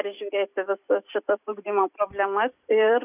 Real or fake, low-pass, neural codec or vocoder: fake; 3.6 kHz; vocoder, 44.1 kHz, 128 mel bands every 256 samples, BigVGAN v2